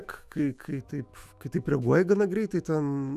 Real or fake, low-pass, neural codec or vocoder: fake; 14.4 kHz; autoencoder, 48 kHz, 128 numbers a frame, DAC-VAE, trained on Japanese speech